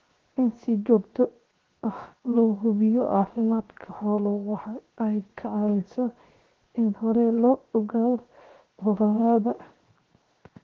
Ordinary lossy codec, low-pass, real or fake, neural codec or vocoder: Opus, 16 kbps; 7.2 kHz; fake; codec, 16 kHz, 0.7 kbps, FocalCodec